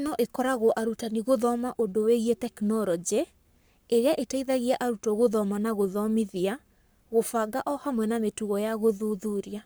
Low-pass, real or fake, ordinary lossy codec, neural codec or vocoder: none; fake; none; codec, 44.1 kHz, 7.8 kbps, Pupu-Codec